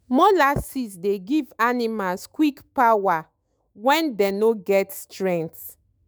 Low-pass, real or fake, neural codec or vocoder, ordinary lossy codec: none; fake; autoencoder, 48 kHz, 128 numbers a frame, DAC-VAE, trained on Japanese speech; none